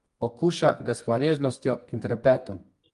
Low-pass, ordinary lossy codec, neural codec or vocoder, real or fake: 10.8 kHz; Opus, 32 kbps; codec, 24 kHz, 0.9 kbps, WavTokenizer, medium music audio release; fake